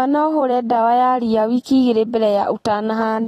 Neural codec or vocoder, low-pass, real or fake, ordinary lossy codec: vocoder, 44.1 kHz, 128 mel bands every 256 samples, BigVGAN v2; 19.8 kHz; fake; AAC, 32 kbps